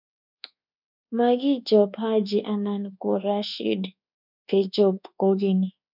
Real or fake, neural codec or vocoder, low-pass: fake; codec, 24 kHz, 1.2 kbps, DualCodec; 5.4 kHz